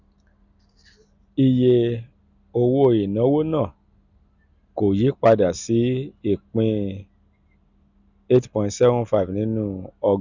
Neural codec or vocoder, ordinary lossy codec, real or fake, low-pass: none; none; real; 7.2 kHz